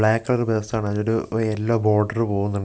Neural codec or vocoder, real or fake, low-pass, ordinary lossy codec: none; real; none; none